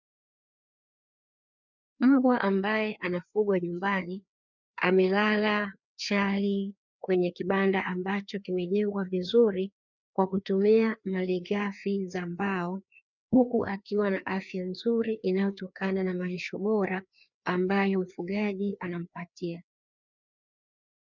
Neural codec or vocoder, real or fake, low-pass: codec, 16 kHz, 2 kbps, FreqCodec, larger model; fake; 7.2 kHz